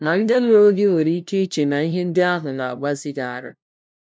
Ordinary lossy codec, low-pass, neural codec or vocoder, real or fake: none; none; codec, 16 kHz, 0.5 kbps, FunCodec, trained on LibriTTS, 25 frames a second; fake